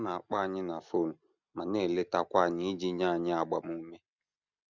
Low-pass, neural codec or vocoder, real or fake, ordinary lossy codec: 7.2 kHz; none; real; none